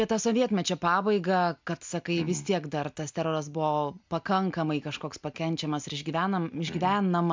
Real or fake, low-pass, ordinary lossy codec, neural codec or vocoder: real; 7.2 kHz; MP3, 64 kbps; none